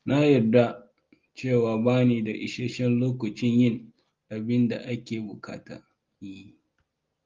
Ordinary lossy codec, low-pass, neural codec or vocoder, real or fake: Opus, 32 kbps; 7.2 kHz; none; real